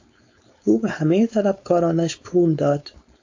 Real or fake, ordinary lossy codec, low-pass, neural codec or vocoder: fake; AAC, 48 kbps; 7.2 kHz; codec, 16 kHz, 4.8 kbps, FACodec